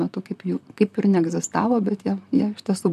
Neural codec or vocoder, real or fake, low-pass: vocoder, 44.1 kHz, 128 mel bands, Pupu-Vocoder; fake; 14.4 kHz